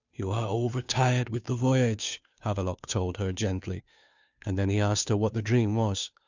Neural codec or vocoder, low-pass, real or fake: codec, 16 kHz, 2 kbps, FunCodec, trained on Chinese and English, 25 frames a second; 7.2 kHz; fake